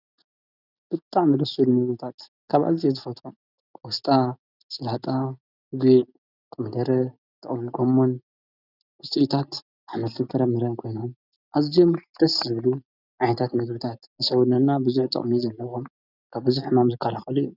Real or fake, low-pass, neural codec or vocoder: real; 5.4 kHz; none